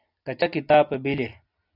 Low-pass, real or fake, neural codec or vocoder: 5.4 kHz; real; none